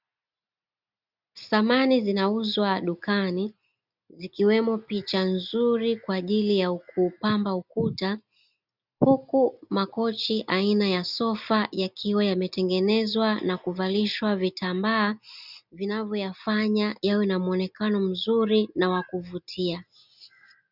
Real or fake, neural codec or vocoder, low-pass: real; none; 5.4 kHz